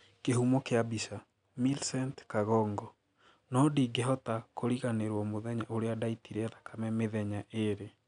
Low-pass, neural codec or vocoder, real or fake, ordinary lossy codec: 9.9 kHz; none; real; none